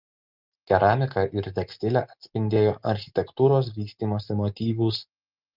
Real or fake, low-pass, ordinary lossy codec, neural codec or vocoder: real; 5.4 kHz; Opus, 24 kbps; none